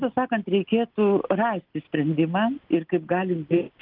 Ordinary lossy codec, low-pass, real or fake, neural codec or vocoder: Opus, 24 kbps; 5.4 kHz; real; none